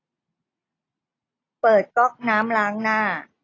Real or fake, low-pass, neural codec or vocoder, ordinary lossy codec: real; 7.2 kHz; none; AAC, 32 kbps